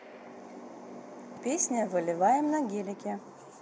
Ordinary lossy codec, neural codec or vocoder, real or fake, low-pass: none; none; real; none